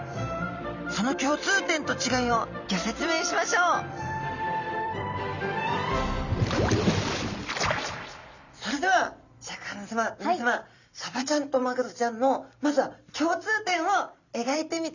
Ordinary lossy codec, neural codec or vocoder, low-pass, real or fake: none; vocoder, 44.1 kHz, 128 mel bands every 256 samples, BigVGAN v2; 7.2 kHz; fake